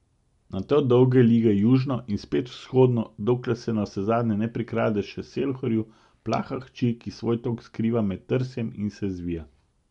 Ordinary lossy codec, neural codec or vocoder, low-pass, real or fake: MP3, 64 kbps; none; 10.8 kHz; real